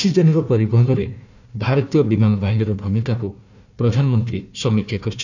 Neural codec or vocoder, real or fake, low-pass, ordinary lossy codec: codec, 16 kHz, 1 kbps, FunCodec, trained on Chinese and English, 50 frames a second; fake; 7.2 kHz; none